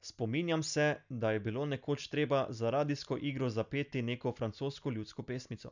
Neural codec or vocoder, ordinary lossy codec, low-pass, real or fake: none; none; 7.2 kHz; real